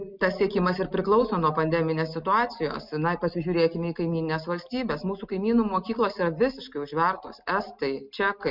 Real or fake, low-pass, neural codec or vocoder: real; 5.4 kHz; none